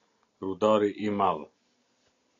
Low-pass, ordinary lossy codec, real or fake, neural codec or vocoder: 7.2 kHz; AAC, 64 kbps; real; none